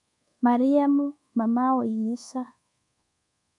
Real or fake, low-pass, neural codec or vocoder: fake; 10.8 kHz; codec, 24 kHz, 1.2 kbps, DualCodec